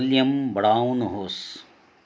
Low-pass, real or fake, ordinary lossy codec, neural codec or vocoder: none; real; none; none